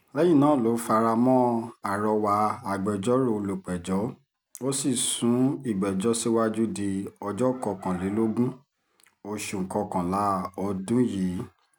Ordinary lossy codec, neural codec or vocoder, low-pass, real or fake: none; none; none; real